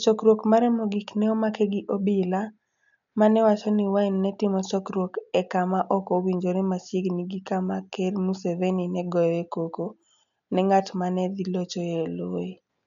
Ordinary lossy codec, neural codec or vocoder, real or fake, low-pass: none; none; real; 7.2 kHz